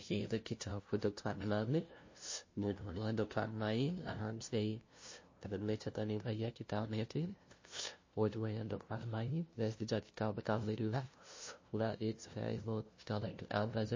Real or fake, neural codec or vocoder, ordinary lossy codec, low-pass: fake; codec, 16 kHz, 0.5 kbps, FunCodec, trained on LibriTTS, 25 frames a second; MP3, 32 kbps; 7.2 kHz